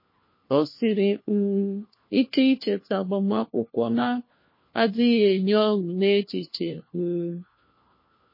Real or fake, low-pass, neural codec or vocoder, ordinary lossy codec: fake; 5.4 kHz; codec, 16 kHz, 1 kbps, FunCodec, trained on LibriTTS, 50 frames a second; MP3, 24 kbps